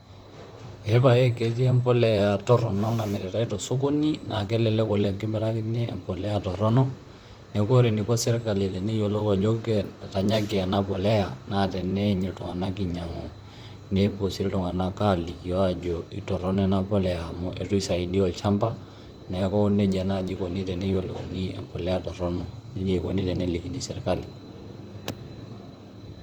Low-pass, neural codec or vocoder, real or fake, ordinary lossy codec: 19.8 kHz; vocoder, 44.1 kHz, 128 mel bands, Pupu-Vocoder; fake; Opus, 64 kbps